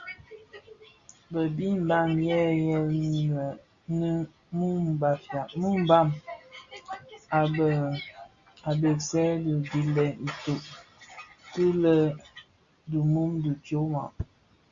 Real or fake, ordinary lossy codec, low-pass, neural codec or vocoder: real; Opus, 64 kbps; 7.2 kHz; none